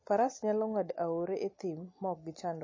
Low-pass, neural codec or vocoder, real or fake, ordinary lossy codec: 7.2 kHz; none; real; MP3, 32 kbps